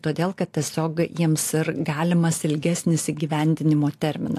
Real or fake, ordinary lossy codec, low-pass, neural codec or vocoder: real; AAC, 64 kbps; 14.4 kHz; none